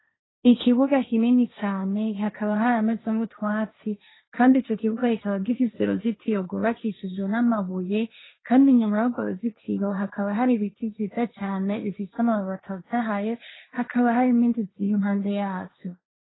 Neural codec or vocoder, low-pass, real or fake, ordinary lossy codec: codec, 16 kHz, 1.1 kbps, Voila-Tokenizer; 7.2 kHz; fake; AAC, 16 kbps